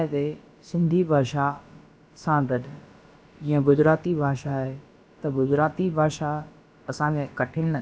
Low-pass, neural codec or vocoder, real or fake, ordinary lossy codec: none; codec, 16 kHz, about 1 kbps, DyCAST, with the encoder's durations; fake; none